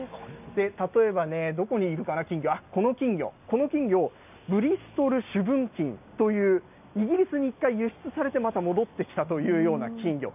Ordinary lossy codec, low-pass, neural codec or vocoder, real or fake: none; 3.6 kHz; none; real